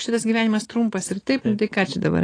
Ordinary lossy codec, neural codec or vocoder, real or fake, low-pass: AAC, 32 kbps; none; real; 9.9 kHz